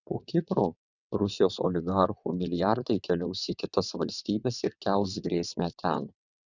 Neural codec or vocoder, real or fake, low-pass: vocoder, 22.05 kHz, 80 mel bands, WaveNeXt; fake; 7.2 kHz